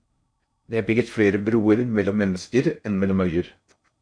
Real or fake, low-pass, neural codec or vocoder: fake; 9.9 kHz; codec, 16 kHz in and 24 kHz out, 0.6 kbps, FocalCodec, streaming, 4096 codes